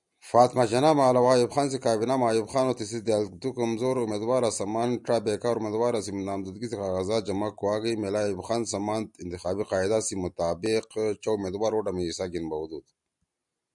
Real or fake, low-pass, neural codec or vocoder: real; 10.8 kHz; none